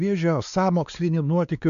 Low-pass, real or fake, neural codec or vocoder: 7.2 kHz; fake; codec, 16 kHz, 2 kbps, X-Codec, WavLM features, trained on Multilingual LibriSpeech